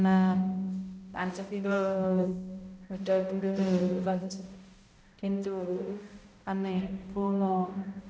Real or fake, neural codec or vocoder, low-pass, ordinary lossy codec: fake; codec, 16 kHz, 0.5 kbps, X-Codec, HuBERT features, trained on balanced general audio; none; none